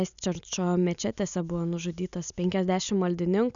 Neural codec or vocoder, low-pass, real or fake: none; 7.2 kHz; real